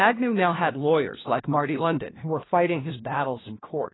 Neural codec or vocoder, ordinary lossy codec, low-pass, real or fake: codec, 16 kHz, 1 kbps, FunCodec, trained on LibriTTS, 50 frames a second; AAC, 16 kbps; 7.2 kHz; fake